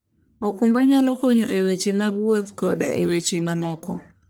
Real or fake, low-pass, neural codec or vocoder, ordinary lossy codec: fake; none; codec, 44.1 kHz, 1.7 kbps, Pupu-Codec; none